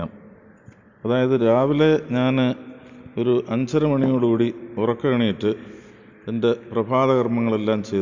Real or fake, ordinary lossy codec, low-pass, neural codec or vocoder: real; MP3, 64 kbps; 7.2 kHz; none